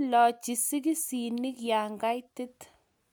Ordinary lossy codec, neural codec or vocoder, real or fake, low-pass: none; none; real; none